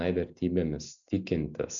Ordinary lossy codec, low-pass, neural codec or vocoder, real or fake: MP3, 96 kbps; 7.2 kHz; none; real